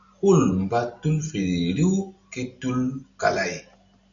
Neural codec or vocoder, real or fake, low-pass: none; real; 7.2 kHz